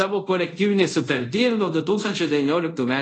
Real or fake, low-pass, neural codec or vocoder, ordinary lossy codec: fake; 10.8 kHz; codec, 24 kHz, 0.5 kbps, DualCodec; AAC, 32 kbps